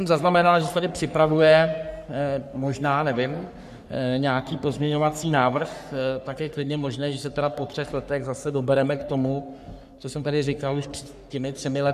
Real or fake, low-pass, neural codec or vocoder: fake; 14.4 kHz; codec, 44.1 kHz, 3.4 kbps, Pupu-Codec